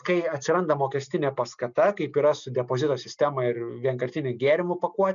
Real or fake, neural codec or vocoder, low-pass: real; none; 7.2 kHz